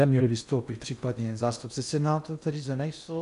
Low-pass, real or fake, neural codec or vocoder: 10.8 kHz; fake; codec, 16 kHz in and 24 kHz out, 0.6 kbps, FocalCodec, streaming, 2048 codes